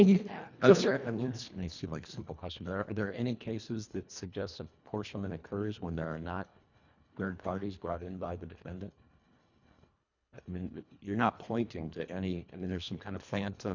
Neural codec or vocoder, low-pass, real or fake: codec, 24 kHz, 1.5 kbps, HILCodec; 7.2 kHz; fake